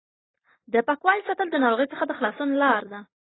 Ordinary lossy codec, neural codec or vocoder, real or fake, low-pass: AAC, 16 kbps; none; real; 7.2 kHz